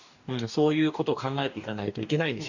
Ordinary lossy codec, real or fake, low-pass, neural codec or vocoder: none; fake; 7.2 kHz; codec, 44.1 kHz, 2.6 kbps, DAC